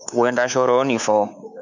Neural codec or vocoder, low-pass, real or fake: codec, 24 kHz, 3.1 kbps, DualCodec; 7.2 kHz; fake